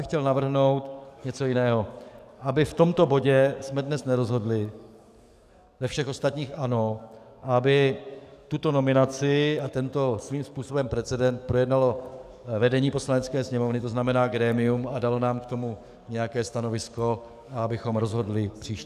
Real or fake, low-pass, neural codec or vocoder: fake; 14.4 kHz; codec, 44.1 kHz, 7.8 kbps, DAC